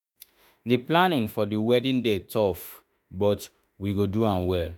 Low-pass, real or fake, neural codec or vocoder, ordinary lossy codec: none; fake; autoencoder, 48 kHz, 32 numbers a frame, DAC-VAE, trained on Japanese speech; none